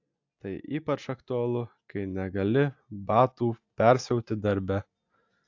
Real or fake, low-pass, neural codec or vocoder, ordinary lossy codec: real; 7.2 kHz; none; AAC, 48 kbps